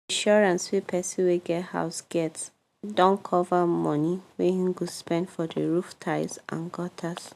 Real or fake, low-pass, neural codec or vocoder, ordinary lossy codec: real; 14.4 kHz; none; none